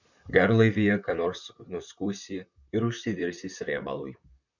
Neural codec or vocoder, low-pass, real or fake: vocoder, 44.1 kHz, 128 mel bands, Pupu-Vocoder; 7.2 kHz; fake